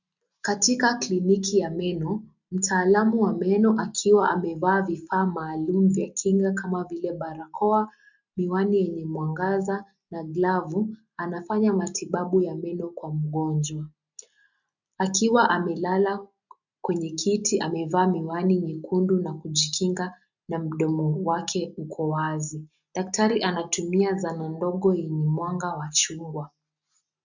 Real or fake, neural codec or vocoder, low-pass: real; none; 7.2 kHz